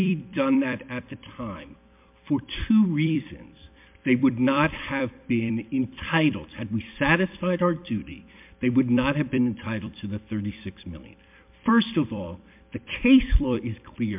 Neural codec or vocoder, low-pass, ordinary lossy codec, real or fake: vocoder, 44.1 kHz, 128 mel bands every 256 samples, BigVGAN v2; 3.6 kHz; MP3, 32 kbps; fake